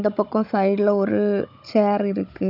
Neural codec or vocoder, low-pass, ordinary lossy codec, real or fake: codec, 16 kHz, 16 kbps, FunCodec, trained on Chinese and English, 50 frames a second; 5.4 kHz; none; fake